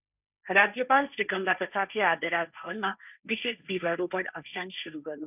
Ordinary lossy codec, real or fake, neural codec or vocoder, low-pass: none; fake; codec, 16 kHz, 1.1 kbps, Voila-Tokenizer; 3.6 kHz